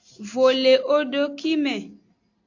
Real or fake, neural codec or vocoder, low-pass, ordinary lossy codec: real; none; 7.2 kHz; AAC, 48 kbps